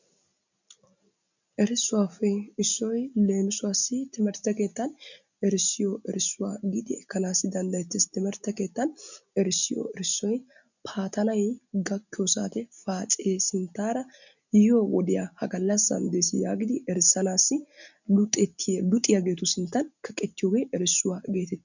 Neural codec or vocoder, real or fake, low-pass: none; real; 7.2 kHz